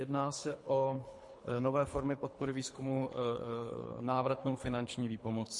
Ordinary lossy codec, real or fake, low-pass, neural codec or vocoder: MP3, 48 kbps; fake; 10.8 kHz; codec, 24 kHz, 3 kbps, HILCodec